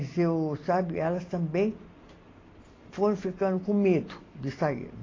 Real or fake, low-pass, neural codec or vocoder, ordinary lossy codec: real; 7.2 kHz; none; AAC, 32 kbps